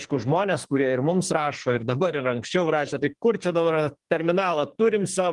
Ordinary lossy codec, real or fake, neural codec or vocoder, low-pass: Opus, 24 kbps; fake; codec, 44.1 kHz, 2.6 kbps, SNAC; 10.8 kHz